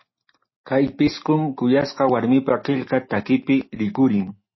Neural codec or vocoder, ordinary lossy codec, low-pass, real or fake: none; MP3, 24 kbps; 7.2 kHz; real